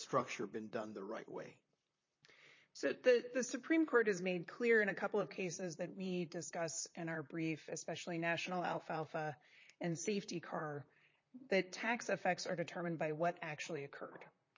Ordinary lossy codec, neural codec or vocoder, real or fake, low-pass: MP3, 32 kbps; vocoder, 44.1 kHz, 128 mel bands, Pupu-Vocoder; fake; 7.2 kHz